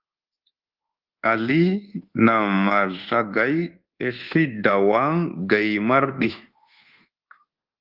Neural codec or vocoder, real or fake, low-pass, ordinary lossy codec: codec, 24 kHz, 1.2 kbps, DualCodec; fake; 5.4 kHz; Opus, 16 kbps